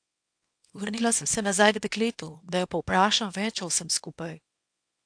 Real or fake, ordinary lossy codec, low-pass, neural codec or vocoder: fake; AAC, 64 kbps; 9.9 kHz; codec, 24 kHz, 0.9 kbps, WavTokenizer, small release